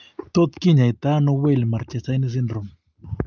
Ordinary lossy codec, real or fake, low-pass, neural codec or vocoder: Opus, 24 kbps; real; 7.2 kHz; none